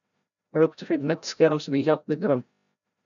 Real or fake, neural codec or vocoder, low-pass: fake; codec, 16 kHz, 0.5 kbps, FreqCodec, larger model; 7.2 kHz